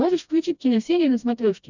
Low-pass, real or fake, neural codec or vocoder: 7.2 kHz; fake; codec, 16 kHz, 1 kbps, FreqCodec, smaller model